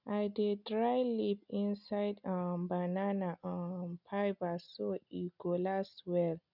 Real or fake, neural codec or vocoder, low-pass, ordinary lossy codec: real; none; 5.4 kHz; none